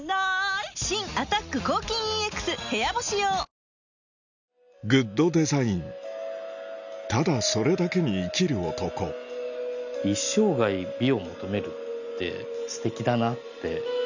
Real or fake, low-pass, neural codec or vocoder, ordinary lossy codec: real; 7.2 kHz; none; none